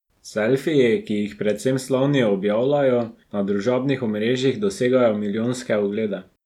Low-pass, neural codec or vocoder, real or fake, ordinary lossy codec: 19.8 kHz; none; real; none